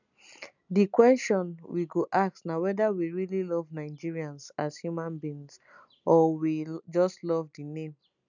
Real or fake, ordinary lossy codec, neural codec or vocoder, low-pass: real; none; none; 7.2 kHz